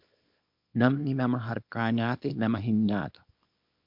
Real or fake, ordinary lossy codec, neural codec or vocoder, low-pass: fake; MP3, 48 kbps; codec, 24 kHz, 0.9 kbps, WavTokenizer, small release; 5.4 kHz